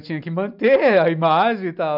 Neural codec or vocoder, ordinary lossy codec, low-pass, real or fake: none; none; 5.4 kHz; real